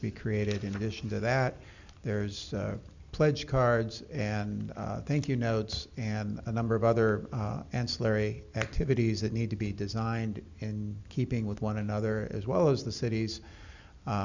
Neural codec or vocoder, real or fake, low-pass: none; real; 7.2 kHz